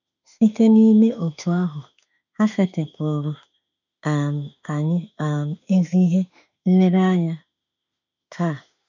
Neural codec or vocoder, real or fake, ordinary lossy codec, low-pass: autoencoder, 48 kHz, 32 numbers a frame, DAC-VAE, trained on Japanese speech; fake; none; 7.2 kHz